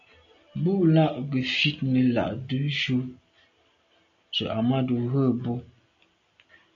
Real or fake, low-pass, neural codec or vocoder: real; 7.2 kHz; none